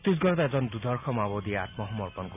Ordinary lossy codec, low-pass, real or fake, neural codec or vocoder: none; 3.6 kHz; real; none